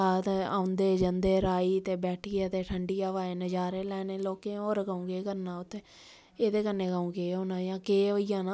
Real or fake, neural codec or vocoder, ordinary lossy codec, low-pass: real; none; none; none